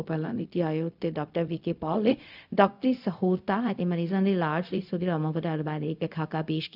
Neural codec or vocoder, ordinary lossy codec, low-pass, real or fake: codec, 16 kHz, 0.4 kbps, LongCat-Audio-Codec; none; 5.4 kHz; fake